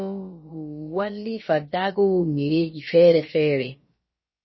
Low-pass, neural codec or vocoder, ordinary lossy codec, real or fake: 7.2 kHz; codec, 16 kHz, about 1 kbps, DyCAST, with the encoder's durations; MP3, 24 kbps; fake